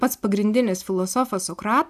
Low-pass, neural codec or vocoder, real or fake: 14.4 kHz; none; real